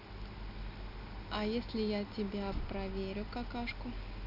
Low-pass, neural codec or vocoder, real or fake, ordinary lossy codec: 5.4 kHz; none; real; none